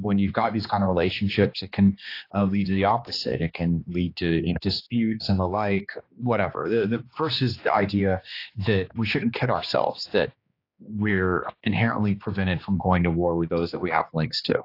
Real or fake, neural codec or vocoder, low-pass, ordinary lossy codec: fake; codec, 16 kHz, 2 kbps, X-Codec, HuBERT features, trained on general audio; 5.4 kHz; AAC, 32 kbps